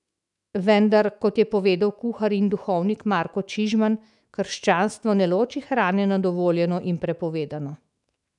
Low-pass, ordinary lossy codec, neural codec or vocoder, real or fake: 10.8 kHz; none; autoencoder, 48 kHz, 128 numbers a frame, DAC-VAE, trained on Japanese speech; fake